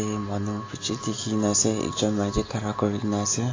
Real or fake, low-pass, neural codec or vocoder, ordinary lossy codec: real; 7.2 kHz; none; AAC, 32 kbps